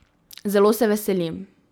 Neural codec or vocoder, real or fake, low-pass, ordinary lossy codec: none; real; none; none